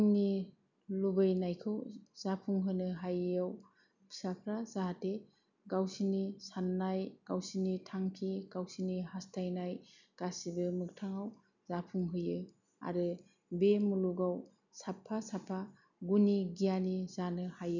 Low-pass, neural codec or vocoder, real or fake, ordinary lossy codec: 7.2 kHz; none; real; none